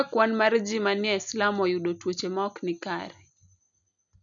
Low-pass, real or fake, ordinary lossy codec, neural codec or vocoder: 7.2 kHz; real; MP3, 96 kbps; none